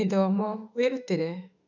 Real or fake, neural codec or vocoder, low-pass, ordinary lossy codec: fake; autoencoder, 48 kHz, 32 numbers a frame, DAC-VAE, trained on Japanese speech; 7.2 kHz; none